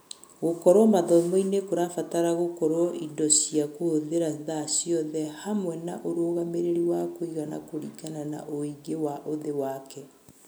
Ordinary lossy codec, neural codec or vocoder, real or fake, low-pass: none; none; real; none